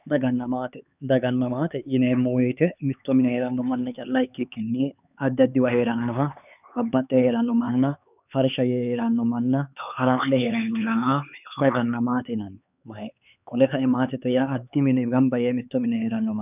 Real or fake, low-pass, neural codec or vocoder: fake; 3.6 kHz; codec, 16 kHz, 4 kbps, X-Codec, HuBERT features, trained on LibriSpeech